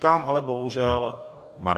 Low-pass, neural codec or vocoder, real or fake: 14.4 kHz; codec, 44.1 kHz, 2.6 kbps, DAC; fake